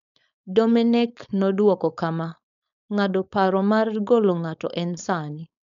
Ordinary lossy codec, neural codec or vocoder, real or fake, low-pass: none; codec, 16 kHz, 4.8 kbps, FACodec; fake; 7.2 kHz